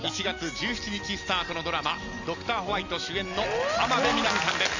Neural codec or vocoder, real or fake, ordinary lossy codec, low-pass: none; real; none; 7.2 kHz